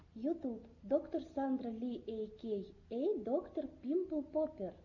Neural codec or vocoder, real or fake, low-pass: none; real; 7.2 kHz